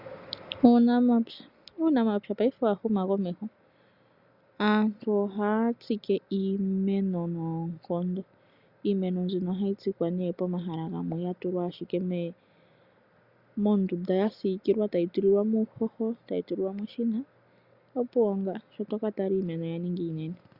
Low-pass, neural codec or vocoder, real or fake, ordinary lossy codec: 5.4 kHz; none; real; Opus, 64 kbps